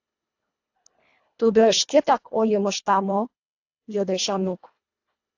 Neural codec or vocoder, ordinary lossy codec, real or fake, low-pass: codec, 24 kHz, 1.5 kbps, HILCodec; AAC, 48 kbps; fake; 7.2 kHz